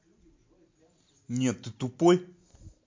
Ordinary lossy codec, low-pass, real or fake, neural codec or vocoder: MP3, 48 kbps; 7.2 kHz; real; none